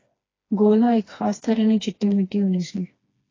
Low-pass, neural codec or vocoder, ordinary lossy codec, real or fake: 7.2 kHz; codec, 16 kHz, 2 kbps, FreqCodec, smaller model; AAC, 32 kbps; fake